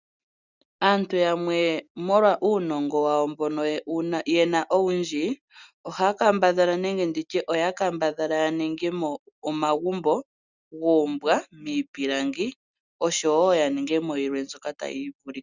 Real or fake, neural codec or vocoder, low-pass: real; none; 7.2 kHz